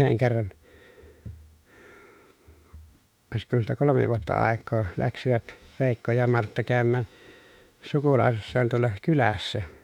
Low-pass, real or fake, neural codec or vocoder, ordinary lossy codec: 19.8 kHz; fake; autoencoder, 48 kHz, 32 numbers a frame, DAC-VAE, trained on Japanese speech; none